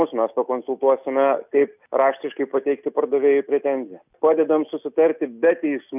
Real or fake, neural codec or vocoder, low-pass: real; none; 3.6 kHz